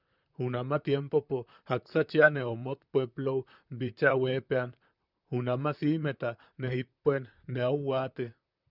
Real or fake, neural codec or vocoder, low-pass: fake; vocoder, 44.1 kHz, 128 mel bands, Pupu-Vocoder; 5.4 kHz